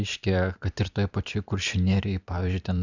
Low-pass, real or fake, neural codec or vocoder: 7.2 kHz; fake; vocoder, 44.1 kHz, 128 mel bands every 512 samples, BigVGAN v2